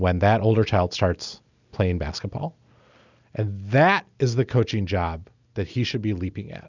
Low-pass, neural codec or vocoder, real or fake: 7.2 kHz; none; real